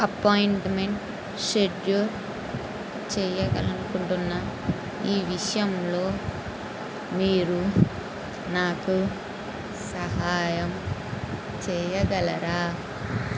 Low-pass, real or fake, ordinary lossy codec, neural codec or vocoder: none; real; none; none